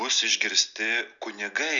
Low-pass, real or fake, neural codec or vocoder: 7.2 kHz; real; none